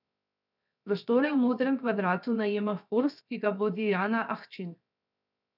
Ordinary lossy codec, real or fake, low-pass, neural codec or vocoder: none; fake; 5.4 kHz; codec, 16 kHz, 0.7 kbps, FocalCodec